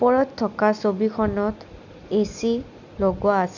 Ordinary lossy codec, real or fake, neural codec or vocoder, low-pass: none; real; none; 7.2 kHz